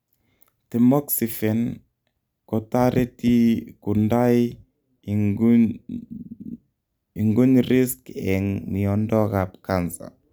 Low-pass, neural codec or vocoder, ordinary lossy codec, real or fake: none; none; none; real